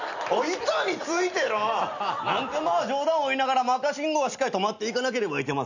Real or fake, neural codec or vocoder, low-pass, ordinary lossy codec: real; none; 7.2 kHz; none